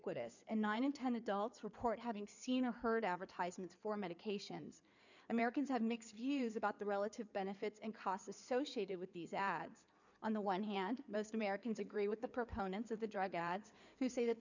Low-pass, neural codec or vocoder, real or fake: 7.2 kHz; codec, 16 kHz in and 24 kHz out, 2.2 kbps, FireRedTTS-2 codec; fake